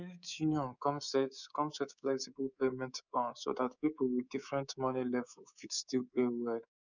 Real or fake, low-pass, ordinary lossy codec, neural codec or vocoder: fake; 7.2 kHz; none; codec, 24 kHz, 3.1 kbps, DualCodec